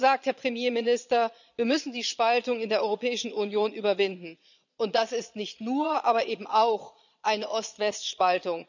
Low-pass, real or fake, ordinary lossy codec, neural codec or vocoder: 7.2 kHz; fake; none; vocoder, 22.05 kHz, 80 mel bands, Vocos